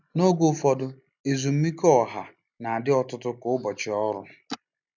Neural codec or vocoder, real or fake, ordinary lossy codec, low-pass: none; real; none; 7.2 kHz